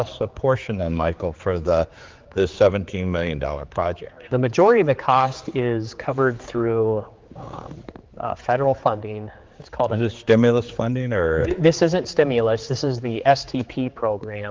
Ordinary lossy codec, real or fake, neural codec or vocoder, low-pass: Opus, 32 kbps; fake; codec, 16 kHz, 4 kbps, X-Codec, HuBERT features, trained on general audio; 7.2 kHz